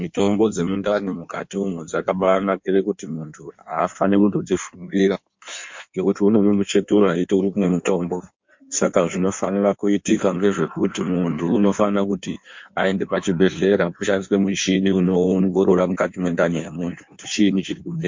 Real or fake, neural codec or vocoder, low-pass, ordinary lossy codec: fake; codec, 16 kHz in and 24 kHz out, 1.1 kbps, FireRedTTS-2 codec; 7.2 kHz; MP3, 48 kbps